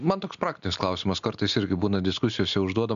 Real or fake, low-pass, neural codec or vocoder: real; 7.2 kHz; none